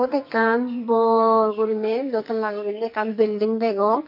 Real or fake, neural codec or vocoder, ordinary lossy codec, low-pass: fake; codec, 44.1 kHz, 2.6 kbps, SNAC; MP3, 48 kbps; 5.4 kHz